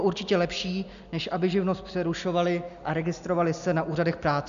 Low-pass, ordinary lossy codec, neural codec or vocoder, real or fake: 7.2 kHz; MP3, 96 kbps; none; real